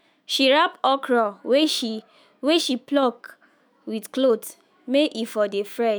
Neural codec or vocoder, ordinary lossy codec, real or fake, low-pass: autoencoder, 48 kHz, 128 numbers a frame, DAC-VAE, trained on Japanese speech; none; fake; none